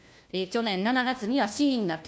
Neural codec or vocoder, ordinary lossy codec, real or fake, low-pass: codec, 16 kHz, 1 kbps, FunCodec, trained on LibriTTS, 50 frames a second; none; fake; none